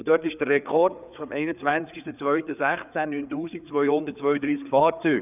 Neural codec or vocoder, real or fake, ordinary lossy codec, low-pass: codec, 16 kHz, 4 kbps, FreqCodec, larger model; fake; none; 3.6 kHz